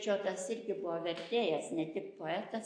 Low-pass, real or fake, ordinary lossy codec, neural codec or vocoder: 9.9 kHz; real; AAC, 48 kbps; none